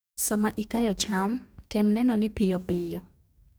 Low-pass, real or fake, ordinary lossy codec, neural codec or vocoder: none; fake; none; codec, 44.1 kHz, 2.6 kbps, DAC